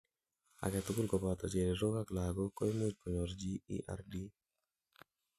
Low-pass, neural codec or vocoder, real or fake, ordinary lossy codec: 14.4 kHz; none; real; none